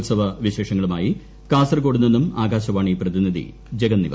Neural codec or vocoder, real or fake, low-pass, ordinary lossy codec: none; real; none; none